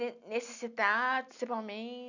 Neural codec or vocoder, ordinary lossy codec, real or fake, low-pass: none; none; real; 7.2 kHz